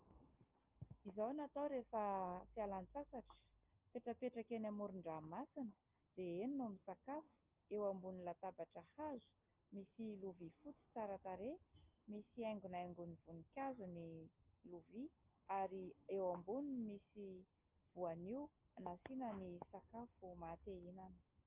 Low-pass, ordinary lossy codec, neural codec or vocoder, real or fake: 3.6 kHz; Opus, 32 kbps; none; real